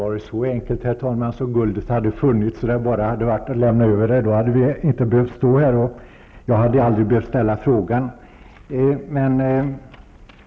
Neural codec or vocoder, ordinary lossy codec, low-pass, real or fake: none; none; none; real